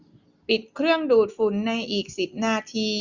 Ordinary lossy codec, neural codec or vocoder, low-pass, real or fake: Opus, 64 kbps; none; 7.2 kHz; real